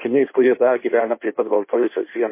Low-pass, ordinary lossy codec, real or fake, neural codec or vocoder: 3.6 kHz; MP3, 24 kbps; fake; codec, 16 kHz in and 24 kHz out, 1.1 kbps, FireRedTTS-2 codec